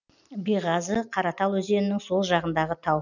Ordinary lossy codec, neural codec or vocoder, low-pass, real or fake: none; none; 7.2 kHz; real